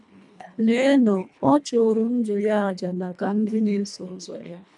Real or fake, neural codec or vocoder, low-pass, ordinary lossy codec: fake; codec, 24 kHz, 1.5 kbps, HILCodec; 10.8 kHz; none